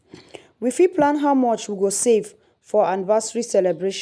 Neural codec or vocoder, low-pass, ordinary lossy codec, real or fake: none; none; none; real